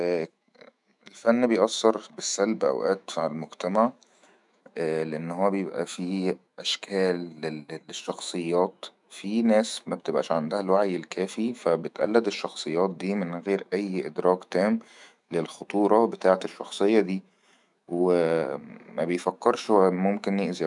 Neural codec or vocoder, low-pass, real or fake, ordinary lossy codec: autoencoder, 48 kHz, 128 numbers a frame, DAC-VAE, trained on Japanese speech; 10.8 kHz; fake; none